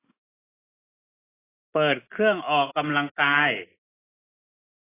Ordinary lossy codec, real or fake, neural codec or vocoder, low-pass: AAC, 16 kbps; real; none; 3.6 kHz